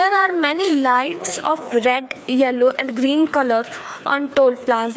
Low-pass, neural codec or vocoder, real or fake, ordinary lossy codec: none; codec, 16 kHz, 2 kbps, FreqCodec, larger model; fake; none